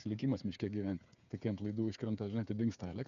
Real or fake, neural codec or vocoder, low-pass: fake; codec, 16 kHz, 8 kbps, FreqCodec, smaller model; 7.2 kHz